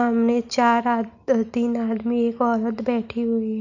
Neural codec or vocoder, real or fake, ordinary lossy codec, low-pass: none; real; none; 7.2 kHz